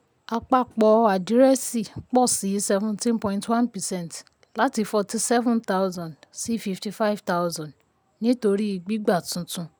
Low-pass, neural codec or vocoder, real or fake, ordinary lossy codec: none; none; real; none